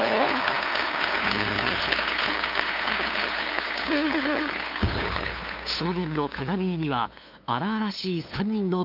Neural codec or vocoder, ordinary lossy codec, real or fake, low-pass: codec, 16 kHz, 2 kbps, FunCodec, trained on LibriTTS, 25 frames a second; none; fake; 5.4 kHz